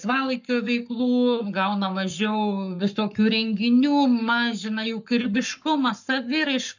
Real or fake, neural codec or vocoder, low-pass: fake; codec, 44.1 kHz, 7.8 kbps, Pupu-Codec; 7.2 kHz